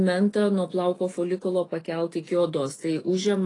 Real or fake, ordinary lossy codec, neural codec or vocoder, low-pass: fake; AAC, 32 kbps; autoencoder, 48 kHz, 128 numbers a frame, DAC-VAE, trained on Japanese speech; 10.8 kHz